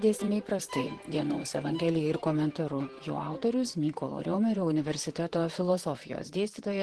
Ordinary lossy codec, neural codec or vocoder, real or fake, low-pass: Opus, 16 kbps; vocoder, 44.1 kHz, 128 mel bands, Pupu-Vocoder; fake; 10.8 kHz